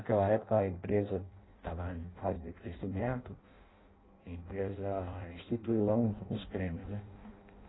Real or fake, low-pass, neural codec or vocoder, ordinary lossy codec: fake; 7.2 kHz; codec, 16 kHz in and 24 kHz out, 0.6 kbps, FireRedTTS-2 codec; AAC, 16 kbps